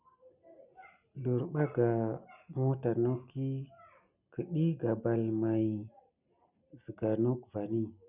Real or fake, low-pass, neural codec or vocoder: real; 3.6 kHz; none